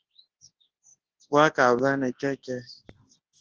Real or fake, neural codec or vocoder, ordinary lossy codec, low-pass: fake; codec, 24 kHz, 0.9 kbps, WavTokenizer, large speech release; Opus, 16 kbps; 7.2 kHz